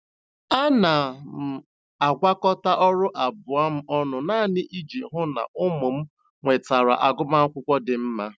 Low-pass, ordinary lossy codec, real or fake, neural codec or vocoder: none; none; real; none